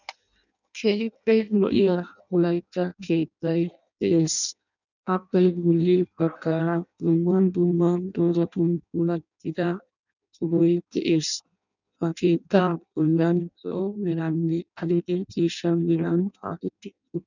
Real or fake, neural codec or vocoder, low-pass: fake; codec, 16 kHz in and 24 kHz out, 0.6 kbps, FireRedTTS-2 codec; 7.2 kHz